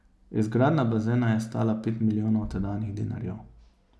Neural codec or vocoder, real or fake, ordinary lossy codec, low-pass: none; real; none; none